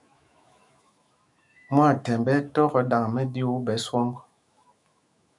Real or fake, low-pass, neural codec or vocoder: fake; 10.8 kHz; autoencoder, 48 kHz, 128 numbers a frame, DAC-VAE, trained on Japanese speech